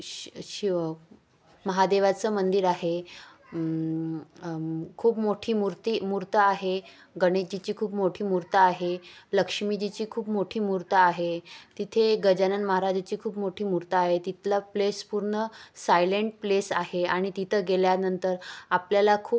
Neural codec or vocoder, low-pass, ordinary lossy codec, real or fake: none; none; none; real